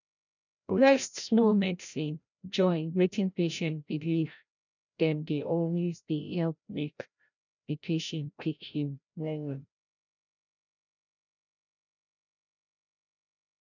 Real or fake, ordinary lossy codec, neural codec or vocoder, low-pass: fake; none; codec, 16 kHz, 0.5 kbps, FreqCodec, larger model; 7.2 kHz